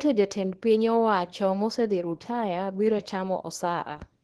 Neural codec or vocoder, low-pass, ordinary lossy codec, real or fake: codec, 24 kHz, 0.9 kbps, WavTokenizer, small release; 10.8 kHz; Opus, 16 kbps; fake